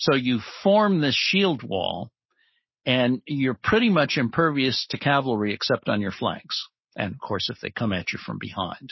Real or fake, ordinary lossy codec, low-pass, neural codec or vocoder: real; MP3, 24 kbps; 7.2 kHz; none